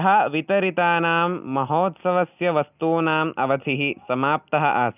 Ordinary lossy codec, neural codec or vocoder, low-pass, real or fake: none; none; 3.6 kHz; real